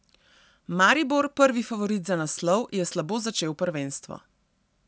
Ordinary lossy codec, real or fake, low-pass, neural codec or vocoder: none; real; none; none